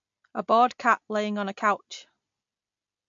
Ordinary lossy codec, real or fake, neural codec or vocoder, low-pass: MP3, 48 kbps; real; none; 7.2 kHz